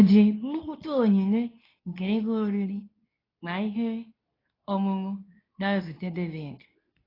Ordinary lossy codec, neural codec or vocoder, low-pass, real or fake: AAC, 24 kbps; codec, 24 kHz, 0.9 kbps, WavTokenizer, medium speech release version 2; 5.4 kHz; fake